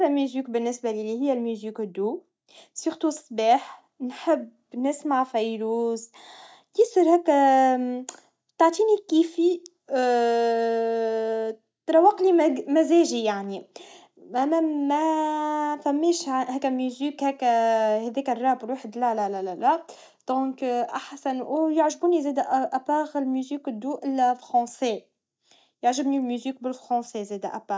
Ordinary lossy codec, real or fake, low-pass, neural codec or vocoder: none; real; none; none